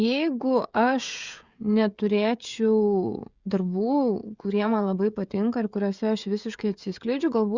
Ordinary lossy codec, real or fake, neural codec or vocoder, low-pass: Opus, 64 kbps; fake; codec, 16 kHz, 16 kbps, FreqCodec, smaller model; 7.2 kHz